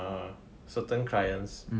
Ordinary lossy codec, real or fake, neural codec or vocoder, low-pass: none; real; none; none